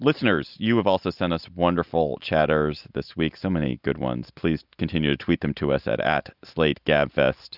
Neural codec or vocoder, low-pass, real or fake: none; 5.4 kHz; real